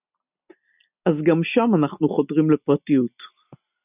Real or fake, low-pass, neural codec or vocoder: real; 3.6 kHz; none